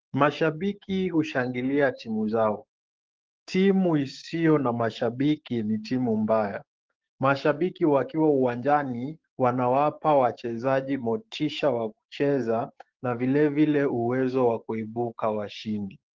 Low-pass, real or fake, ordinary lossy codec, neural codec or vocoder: 7.2 kHz; fake; Opus, 16 kbps; codec, 16 kHz, 6 kbps, DAC